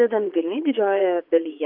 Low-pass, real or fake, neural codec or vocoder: 5.4 kHz; fake; vocoder, 22.05 kHz, 80 mel bands, Vocos